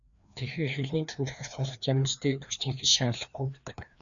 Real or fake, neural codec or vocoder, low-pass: fake; codec, 16 kHz, 2 kbps, FreqCodec, larger model; 7.2 kHz